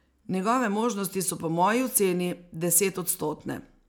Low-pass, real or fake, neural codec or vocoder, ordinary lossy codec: none; real; none; none